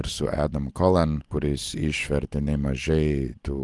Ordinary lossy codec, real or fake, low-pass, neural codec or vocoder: Opus, 24 kbps; real; 10.8 kHz; none